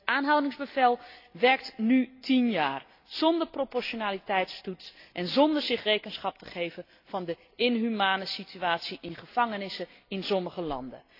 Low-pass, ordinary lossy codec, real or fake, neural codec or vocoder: 5.4 kHz; AAC, 32 kbps; real; none